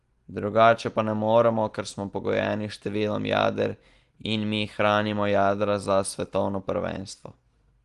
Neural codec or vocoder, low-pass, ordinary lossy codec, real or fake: none; 10.8 kHz; Opus, 24 kbps; real